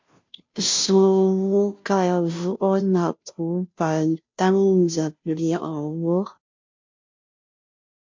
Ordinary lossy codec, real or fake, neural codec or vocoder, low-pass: MP3, 48 kbps; fake; codec, 16 kHz, 0.5 kbps, FunCodec, trained on Chinese and English, 25 frames a second; 7.2 kHz